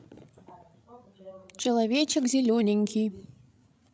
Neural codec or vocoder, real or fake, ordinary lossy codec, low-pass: codec, 16 kHz, 16 kbps, FreqCodec, larger model; fake; none; none